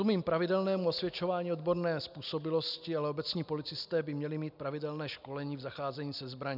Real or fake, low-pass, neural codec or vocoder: real; 5.4 kHz; none